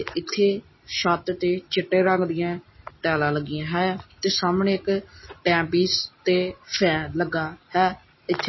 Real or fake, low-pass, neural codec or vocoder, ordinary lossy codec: real; 7.2 kHz; none; MP3, 24 kbps